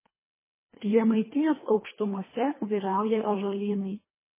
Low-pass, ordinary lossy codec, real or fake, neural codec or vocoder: 3.6 kHz; MP3, 16 kbps; fake; codec, 24 kHz, 1.5 kbps, HILCodec